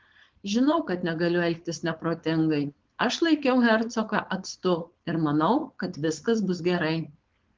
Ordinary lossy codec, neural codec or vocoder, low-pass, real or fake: Opus, 16 kbps; codec, 16 kHz, 4.8 kbps, FACodec; 7.2 kHz; fake